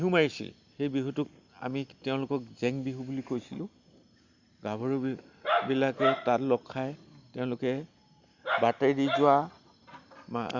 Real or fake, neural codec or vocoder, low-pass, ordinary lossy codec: real; none; 7.2 kHz; Opus, 64 kbps